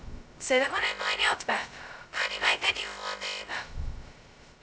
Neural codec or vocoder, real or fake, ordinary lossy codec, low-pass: codec, 16 kHz, 0.2 kbps, FocalCodec; fake; none; none